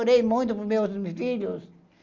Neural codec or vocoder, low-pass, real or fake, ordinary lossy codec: none; 7.2 kHz; real; Opus, 32 kbps